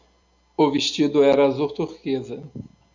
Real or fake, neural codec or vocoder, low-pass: real; none; 7.2 kHz